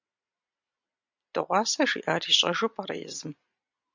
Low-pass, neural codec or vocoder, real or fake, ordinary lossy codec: 7.2 kHz; none; real; MP3, 64 kbps